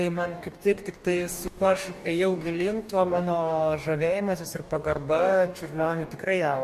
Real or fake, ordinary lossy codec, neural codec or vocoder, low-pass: fake; MP3, 64 kbps; codec, 44.1 kHz, 2.6 kbps, DAC; 14.4 kHz